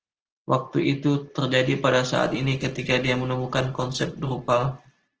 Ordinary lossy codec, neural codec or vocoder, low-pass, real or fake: Opus, 16 kbps; none; 7.2 kHz; real